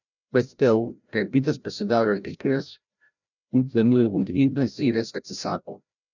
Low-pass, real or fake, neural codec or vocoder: 7.2 kHz; fake; codec, 16 kHz, 0.5 kbps, FreqCodec, larger model